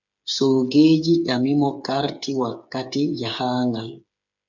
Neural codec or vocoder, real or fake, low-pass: codec, 16 kHz, 8 kbps, FreqCodec, smaller model; fake; 7.2 kHz